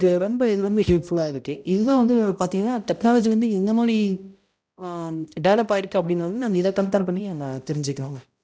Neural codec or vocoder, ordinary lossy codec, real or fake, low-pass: codec, 16 kHz, 0.5 kbps, X-Codec, HuBERT features, trained on balanced general audio; none; fake; none